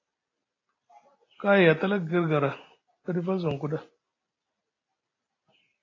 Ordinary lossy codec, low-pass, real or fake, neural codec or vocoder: AAC, 32 kbps; 7.2 kHz; real; none